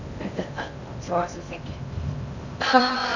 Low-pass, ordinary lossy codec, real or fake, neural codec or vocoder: 7.2 kHz; none; fake; codec, 16 kHz in and 24 kHz out, 0.6 kbps, FocalCodec, streaming, 2048 codes